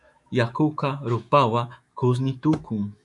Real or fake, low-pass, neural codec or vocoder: fake; 10.8 kHz; autoencoder, 48 kHz, 128 numbers a frame, DAC-VAE, trained on Japanese speech